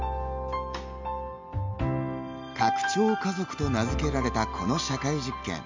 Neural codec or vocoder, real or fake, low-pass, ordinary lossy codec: none; real; 7.2 kHz; MP3, 64 kbps